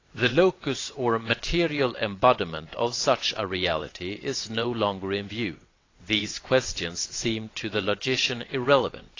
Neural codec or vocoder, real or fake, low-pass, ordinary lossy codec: vocoder, 22.05 kHz, 80 mel bands, WaveNeXt; fake; 7.2 kHz; AAC, 32 kbps